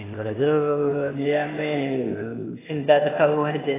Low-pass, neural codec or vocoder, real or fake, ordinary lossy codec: 3.6 kHz; codec, 16 kHz, 0.8 kbps, ZipCodec; fake; AAC, 16 kbps